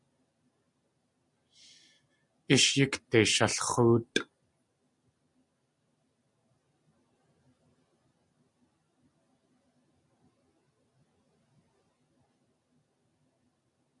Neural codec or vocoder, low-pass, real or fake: none; 10.8 kHz; real